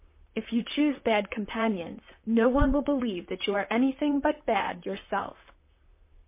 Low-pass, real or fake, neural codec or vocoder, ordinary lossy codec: 3.6 kHz; fake; vocoder, 44.1 kHz, 128 mel bands, Pupu-Vocoder; MP3, 24 kbps